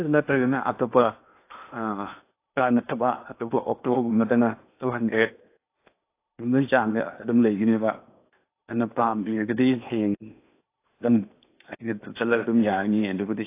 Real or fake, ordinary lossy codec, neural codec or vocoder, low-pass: fake; AAC, 24 kbps; codec, 16 kHz in and 24 kHz out, 0.8 kbps, FocalCodec, streaming, 65536 codes; 3.6 kHz